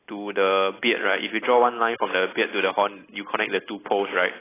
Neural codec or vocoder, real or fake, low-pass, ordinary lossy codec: none; real; 3.6 kHz; AAC, 16 kbps